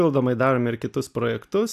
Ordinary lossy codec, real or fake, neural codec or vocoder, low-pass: AAC, 96 kbps; real; none; 14.4 kHz